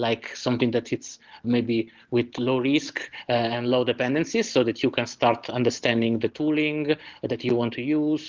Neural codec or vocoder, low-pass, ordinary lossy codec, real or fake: none; 7.2 kHz; Opus, 16 kbps; real